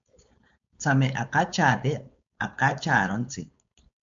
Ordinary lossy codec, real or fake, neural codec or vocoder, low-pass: MP3, 64 kbps; fake; codec, 16 kHz, 4.8 kbps, FACodec; 7.2 kHz